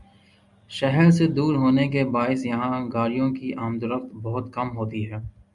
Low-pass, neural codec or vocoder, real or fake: 10.8 kHz; none; real